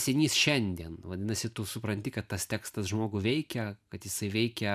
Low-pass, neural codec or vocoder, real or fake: 14.4 kHz; vocoder, 44.1 kHz, 128 mel bands every 512 samples, BigVGAN v2; fake